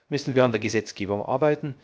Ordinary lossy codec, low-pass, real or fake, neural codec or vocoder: none; none; fake; codec, 16 kHz, 0.3 kbps, FocalCodec